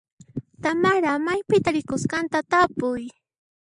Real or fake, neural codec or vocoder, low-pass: real; none; 9.9 kHz